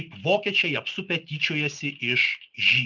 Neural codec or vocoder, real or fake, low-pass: none; real; 7.2 kHz